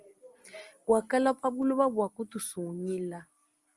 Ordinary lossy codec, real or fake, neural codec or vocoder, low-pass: Opus, 24 kbps; real; none; 10.8 kHz